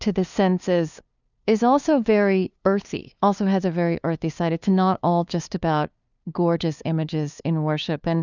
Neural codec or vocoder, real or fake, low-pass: codec, 16 kHz, 2 kbps, FunCodec, trained on LibriTTS, 25 frames a second; fake; 7.2 kHz